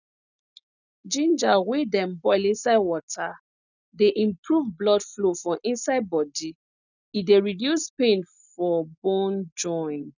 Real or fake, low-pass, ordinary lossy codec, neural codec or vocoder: real; 7.2 kHz; none; none